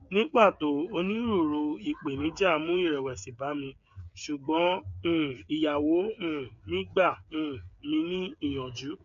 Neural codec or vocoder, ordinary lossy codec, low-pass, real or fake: codec, 16 kHz, 8 kbps, FreqCodec, smaller model; none; 7.2 kHz; fake